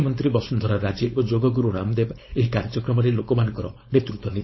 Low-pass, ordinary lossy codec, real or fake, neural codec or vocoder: 7.2 kHz; MP3, 24 kbps; fake; codec, 16 kHz, 4.8 kbps, FACodec